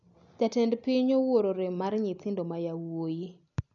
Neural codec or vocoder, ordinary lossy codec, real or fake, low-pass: none; none; real; 7.2 kHz